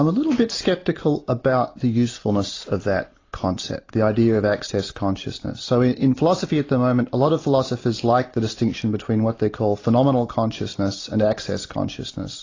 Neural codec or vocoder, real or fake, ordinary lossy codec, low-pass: none; real; AAC, 32 kbps; 7.2 kHz